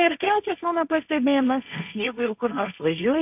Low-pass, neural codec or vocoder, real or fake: 3.6 kHz; codec, 16 kHz, 1.1 kbps, Voila-Tokenizer; fake